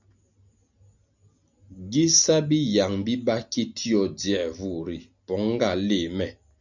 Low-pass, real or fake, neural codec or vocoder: 7.2 kHz; real; none